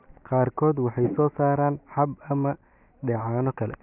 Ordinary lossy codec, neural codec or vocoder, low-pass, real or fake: none; none; 3.6 kHz; real